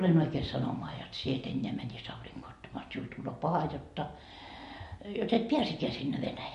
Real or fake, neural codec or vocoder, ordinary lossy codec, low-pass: real; none; MP3, 48 kbps; 14.4 kHz